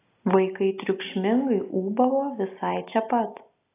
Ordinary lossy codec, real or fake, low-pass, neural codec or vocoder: AAC, 24 kbps; real; 3.6 kHz; none